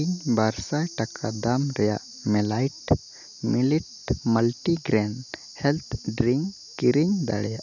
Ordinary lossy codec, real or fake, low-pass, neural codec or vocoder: none; real; 7.2 kHz; none